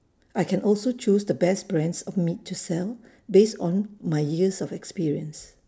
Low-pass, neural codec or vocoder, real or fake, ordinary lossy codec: none; none; real; none